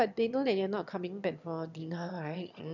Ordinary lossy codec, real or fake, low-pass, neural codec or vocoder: none; fake; 7.2 kHz; autoencoder, 22.05 kHz, a latent of 192 numbers a frame, VITS, trained on one speaker